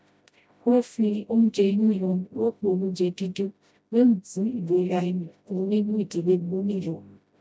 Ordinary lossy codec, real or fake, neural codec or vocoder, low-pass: none; fake; codec, 16 kHz, 0.5 kbps, FreqCodec, smaller model; none